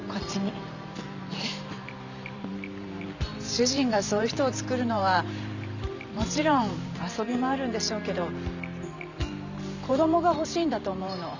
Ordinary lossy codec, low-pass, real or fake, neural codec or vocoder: none; 7.2 kHz; fake; vocoder, 44.1 kHz, 128 mel bands every 512 samples, BigVGAN v2